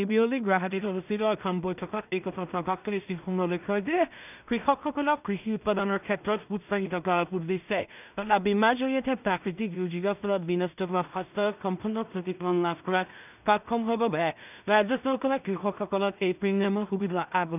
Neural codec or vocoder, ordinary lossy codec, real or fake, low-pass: codec, 16 kHz in and 24 kHz out, 0.4 kbps, LongCat-Audio-Codec, two codebook decoder; none; fake; 3.6 kHz